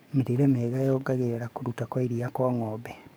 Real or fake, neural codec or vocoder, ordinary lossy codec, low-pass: fake; codec, 44.1 kHz, 7.8 kbps, DAC; none; none